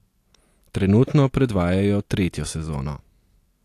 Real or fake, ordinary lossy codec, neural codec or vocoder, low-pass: real; AAC, 64 kbps; none; 14.4 kHz